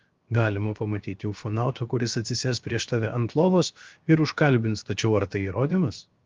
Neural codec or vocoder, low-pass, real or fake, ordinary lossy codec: codec, 16 kHz, 0.7 kbps, FocalCodec; 7.2 kHz; fake; Opus, 32 kbps